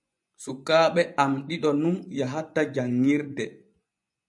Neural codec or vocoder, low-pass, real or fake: vocoder, 24 kHz, 100 mel bands, Vocos; 10.8 kHz; fake